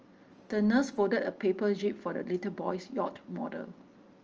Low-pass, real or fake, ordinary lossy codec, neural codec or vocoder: 7.2 kHz; real; Opus, 24 kbps; none